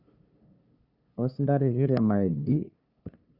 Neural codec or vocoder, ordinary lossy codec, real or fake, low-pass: codec, 16 kHz, 2 kbps, FunCodec, trained on LibriTTS, 25 frames a second; Opus, 64 kbps; fake; 5.4 kHz